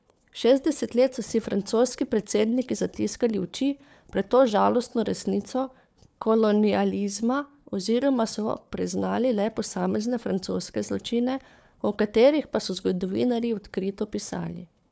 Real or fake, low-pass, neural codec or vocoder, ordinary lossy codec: fake; none; codec, 16 kHz, 4 kbps, FunCodec, trained on Chinese and English, 50 frames a second; none